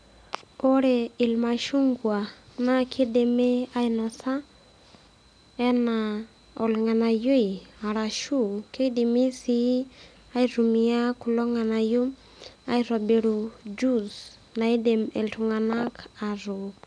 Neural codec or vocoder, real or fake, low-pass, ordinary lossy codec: none; real; 9.9 kHz; none